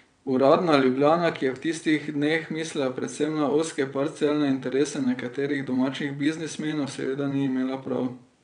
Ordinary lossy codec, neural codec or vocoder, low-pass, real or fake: none; vocoder, 22.05 kHz, 80 mel bands, WaveNeXt; 9.9 kHz; fake